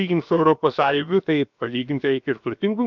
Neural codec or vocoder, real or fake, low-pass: codec, 16 kHz, 0.7 kbps, FocalCodec; fake; 7.2 kHz